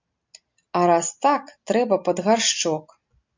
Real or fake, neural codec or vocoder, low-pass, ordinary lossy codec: real; none; 7.2 kHz; MP3, 64 kbps